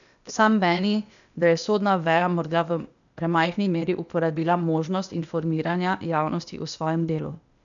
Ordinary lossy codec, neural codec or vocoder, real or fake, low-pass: none; codec, 16 kHz, 0.8 kbps, ZipCodec; fake; 7.2 kHz